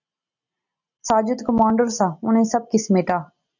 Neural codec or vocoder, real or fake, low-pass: none; real; 7.2 kHz